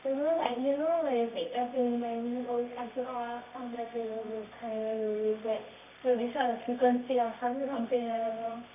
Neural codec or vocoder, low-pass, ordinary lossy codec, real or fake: codec, 24 kHz, 0.9 kbps, WavTokenizer, medium music audio release; 3.6 kHz; none; fake